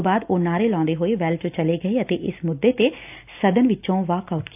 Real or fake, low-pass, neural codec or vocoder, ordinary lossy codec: real; 3.6 kHz; none; AAC, 32 kbps